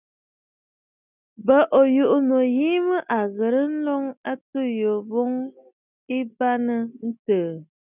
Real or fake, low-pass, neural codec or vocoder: real; 3.6 kHz; none